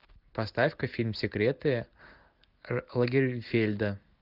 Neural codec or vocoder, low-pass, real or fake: none; 5.4 kHz; real